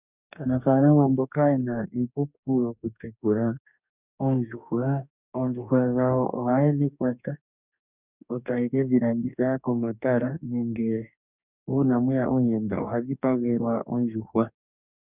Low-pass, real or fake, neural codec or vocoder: 3.6 kHz; fake; codec, 44.1 kHz, 2.6 kbps, DAC